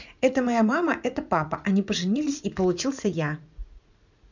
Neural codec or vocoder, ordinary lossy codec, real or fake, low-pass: vocoder, 44.1 kHz, 80 mel bands, Vocos; none; fake; 7.2 kHz